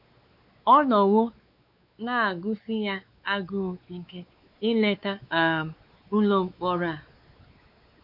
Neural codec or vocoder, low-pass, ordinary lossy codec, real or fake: codec, 16 kHz, 4 kbps, X-Codec, WavLM features, trained on Multilingual LibriSpeech; 5.4 kHz; none; fake